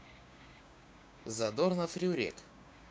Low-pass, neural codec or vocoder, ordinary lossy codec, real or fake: none; codec, 16 kHz, 6 kbps, DAC; none; fake